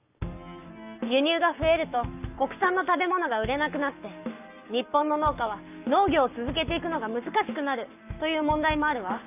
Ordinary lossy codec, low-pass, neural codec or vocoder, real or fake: none; 3.6 kHz; codec, 44.1 kHz, 7.8 kbps, Pupu-Codec; fake